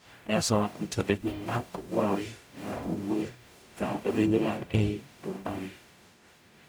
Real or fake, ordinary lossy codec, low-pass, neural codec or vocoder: fake; none; none; codec, 44.1 kHz, 0.9 kbps, DAC